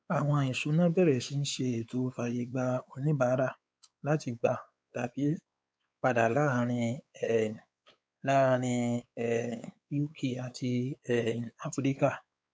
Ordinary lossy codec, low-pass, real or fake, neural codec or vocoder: none; none; fake; codec, 16 kHz, 4 kbps, X-Codec, WavLM features, trained on Multilingual LibriSpeech